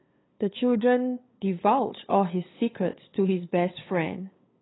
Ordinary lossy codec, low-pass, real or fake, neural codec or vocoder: AAC, 16 kbps; 7.2 kHz; fake; codec, 16 kHz, 8 kbps, FunCodec, trained on LibriTTS, 25 frames a second